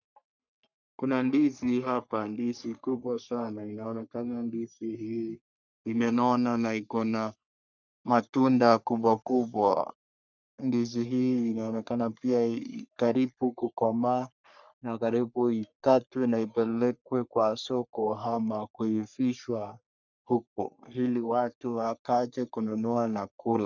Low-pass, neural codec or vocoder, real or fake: 7.2 kHz; codec, 44.1 kHz, 3.4 kbps, Pupu-Codec; fake